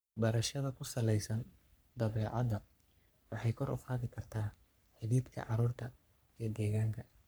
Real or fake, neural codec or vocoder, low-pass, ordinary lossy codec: fake; codec, 44.1 kHz, 3.4 kbps, Pupu-Codec; none; none